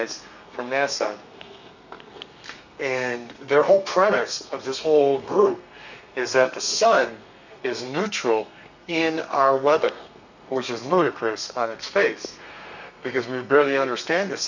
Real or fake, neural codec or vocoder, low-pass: fake; codec, 32 kHz, 1.9 kbps, SNAC; 7.2 kHz